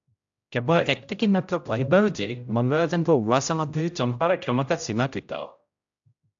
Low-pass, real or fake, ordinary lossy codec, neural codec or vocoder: 7.2 kHz; fake; AAC, 64 kbps; codec, 16 kHz, 0.5 kbps, X-Codec, HuBERT features, trained on general audio